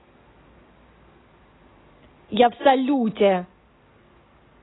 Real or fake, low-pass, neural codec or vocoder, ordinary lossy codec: real; 7.2 kHz; none; AAC, 16 kbps